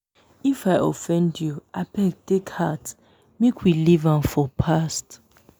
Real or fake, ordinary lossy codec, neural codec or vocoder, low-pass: real; none; none; none